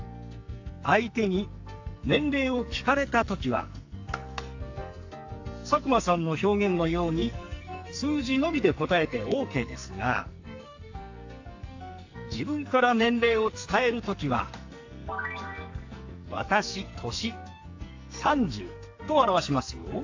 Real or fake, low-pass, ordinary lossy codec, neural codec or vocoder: fake; 7.2 kHz; AAC, 48 kbps; codec, 44.1 kHz, 2.6 kbps, SNAC